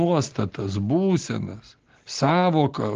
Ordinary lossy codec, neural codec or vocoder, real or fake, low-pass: Opus, 16 kbps; none; real; 7.2 kHz